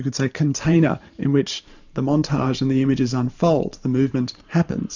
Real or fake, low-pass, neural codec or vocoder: fake; 7.2 kHz; vocoder, 44.1 kHz, 128 mel bands every 512 samples, BigVGAN v2